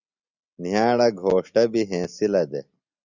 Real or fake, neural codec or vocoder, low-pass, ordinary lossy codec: real; none; 7.2 kHz; Opus, 64 kbps